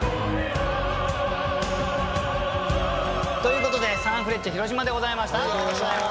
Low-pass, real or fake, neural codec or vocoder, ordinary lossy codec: none; real; none; none